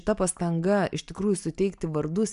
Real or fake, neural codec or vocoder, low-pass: real; none; 10.8 kHz